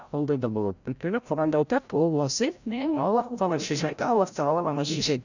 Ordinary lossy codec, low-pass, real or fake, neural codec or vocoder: none; 7.2 kHz; fake; codec, 16 kHz, 0.5 kbps, FreqCodec, larger model